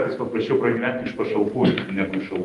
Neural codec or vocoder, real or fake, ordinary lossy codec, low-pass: vocoder, 44.1 kHz, 128 mel bands every 512 samples, BigVGAN v2; fake; Opus, 32 kbps; 10.8 kHz